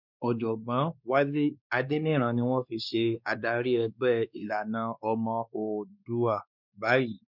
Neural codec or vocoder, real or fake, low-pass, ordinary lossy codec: codec, 16 kHz, 2 kbps, X-Codec, WavLM features, trained on Multilingual LibriSpeech; fake; 5.4 kHz; none